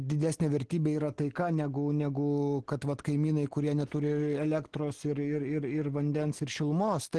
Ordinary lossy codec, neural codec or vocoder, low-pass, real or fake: Opus, 16 kbps; none; 10.8 kHz; real